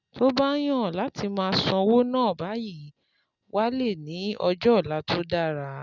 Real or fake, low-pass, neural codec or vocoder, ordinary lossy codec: real; 7.2 kHz; none; none